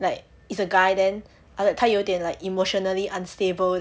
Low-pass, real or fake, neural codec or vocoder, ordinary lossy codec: none; real; none; none